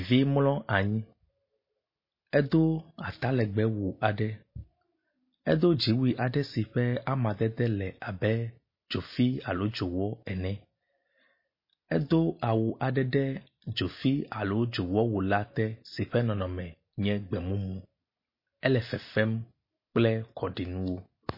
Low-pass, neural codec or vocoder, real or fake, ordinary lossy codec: 5.4 kHz; none; real; MP3, 24 kbps